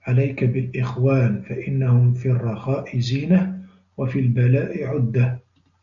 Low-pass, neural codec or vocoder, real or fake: 7.2 kHz; none; real